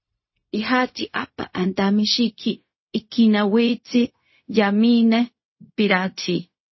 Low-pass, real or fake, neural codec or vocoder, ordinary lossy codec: 7.2 kHz; fake; codec, 16 kHz, 0.4 kbps, LongCat-Audio-Codec; MP3, 24 kbps